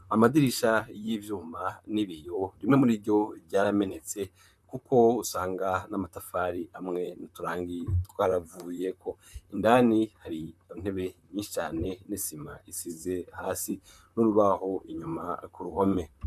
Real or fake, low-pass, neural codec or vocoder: fake; 14.4 kHz; vocoder, 44.1 kHz, 128 mel bands, Pupu-Vocoder